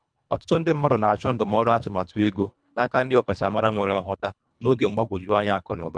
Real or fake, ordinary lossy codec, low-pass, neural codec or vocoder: fake; none; 9.9 kHz; codec, 24 kHz, 1.5 kbps, HILCodec